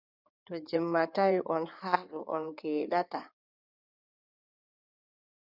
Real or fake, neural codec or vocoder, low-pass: fake; codec, 16 kHz in and 24 kHz out, 2.2 kbps, FireRedTTS-2 codec; 5.4 kHz